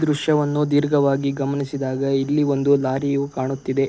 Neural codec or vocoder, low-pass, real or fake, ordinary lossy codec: none; none; real; none